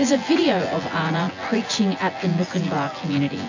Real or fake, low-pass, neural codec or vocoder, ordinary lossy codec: fake; 7.2 kHz; vocoder, 24 kHz, 100 mel bands, Vocos; AAC, 48 kbps